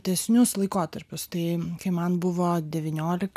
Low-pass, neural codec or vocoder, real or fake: 14.4 kHz; none; real